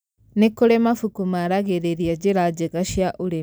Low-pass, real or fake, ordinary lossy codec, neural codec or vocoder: none; real; none; none